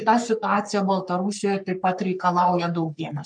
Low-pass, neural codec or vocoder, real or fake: 9.9 kHz; codec, 44.1 kHz, 3.4 kbps, Pupu-Codec; fake